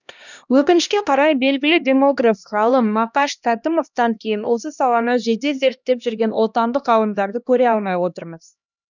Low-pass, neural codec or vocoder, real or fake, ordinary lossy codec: 7.2 kHz; codec, 16 kHz, 1 kbps, X-Codec, HuBERT features, trained on LibriSpeech; fake; none